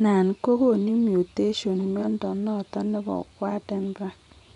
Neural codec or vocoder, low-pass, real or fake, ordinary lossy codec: vocoder, 24 kHz, 100 mel bands, Vocos; 10.8 kHz; fake; none